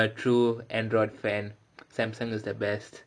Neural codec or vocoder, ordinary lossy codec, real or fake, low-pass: none; none; real; 9.9 kHz